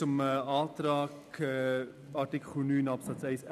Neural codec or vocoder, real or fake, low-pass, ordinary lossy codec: none; real; 14.4 kHz; none